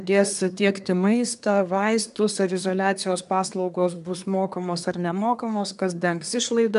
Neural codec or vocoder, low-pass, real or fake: codec, 24 kHz, 1 kbps, SNAC; 10.8 kHz; fake